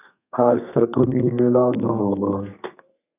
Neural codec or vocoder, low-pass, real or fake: codec, 32 kHz, 1.9 kbps, SNAC; 3.6 kHz; fake